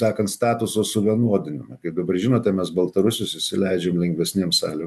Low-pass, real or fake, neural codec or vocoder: 14.4 kHz; real; none